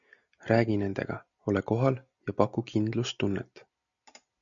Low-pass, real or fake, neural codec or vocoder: 7.2 kHz; real; none